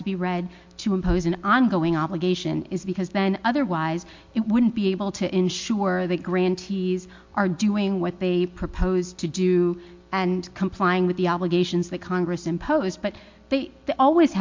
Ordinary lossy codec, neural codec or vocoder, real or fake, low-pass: MP3, 48 kbps; none; real; 7.2 kHz